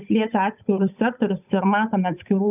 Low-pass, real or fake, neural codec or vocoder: 3.6 kHz; fake; codec, 16 kHz, 8 kbps, FunCodec, trained on Chinese and English, 25 frames a second